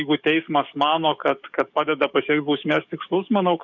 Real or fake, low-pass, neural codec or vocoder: fake; 7.2 kHz; codec, 44.1 kHz, 7.8 kbps, DAC